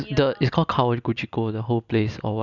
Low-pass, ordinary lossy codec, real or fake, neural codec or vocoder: 7.2 kHz; none; real; none